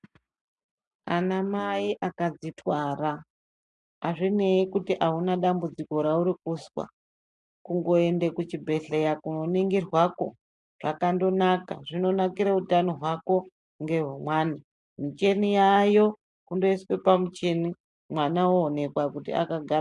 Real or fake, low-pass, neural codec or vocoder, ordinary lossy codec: real; 10.8 kHz; none; MP3, 96 kbps